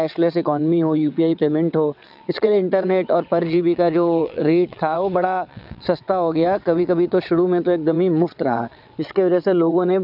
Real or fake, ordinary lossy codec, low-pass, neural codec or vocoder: fake; none; 5.4 kHz; vocoder, 22.05 kHz, 80 mel bands, WaveNeXt